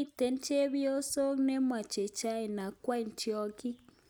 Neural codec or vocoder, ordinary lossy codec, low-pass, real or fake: none; none; none; real